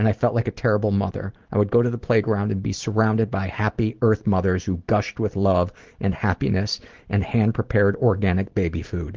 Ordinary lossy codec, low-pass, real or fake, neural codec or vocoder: Opus, 16 kbps; 7.2 kHz; real; none